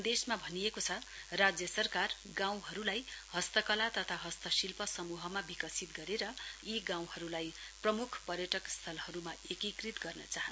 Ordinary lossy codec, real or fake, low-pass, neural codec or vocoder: none; real; none; none